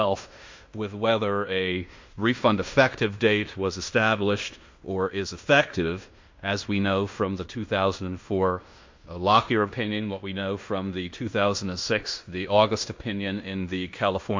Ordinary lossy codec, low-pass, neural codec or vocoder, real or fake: MP3, 48 kbps; 7.2 kHz; codec, 16 kHz in and 24 kHz out, 0.9 kbps, LongCat-Audio-Codec, fine tuned four codebook decoder; fake